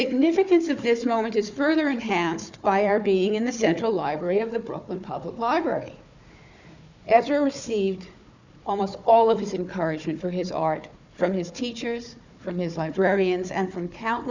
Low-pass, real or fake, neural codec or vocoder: 7.2 kHz; fake; codec, 16 kHz, 4 kbps, FunCodec, trained on Chinese and English, 50 frames a second